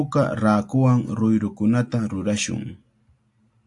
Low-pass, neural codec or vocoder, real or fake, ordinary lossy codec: 10.8 kHz; none; real; AAC, 64 kbps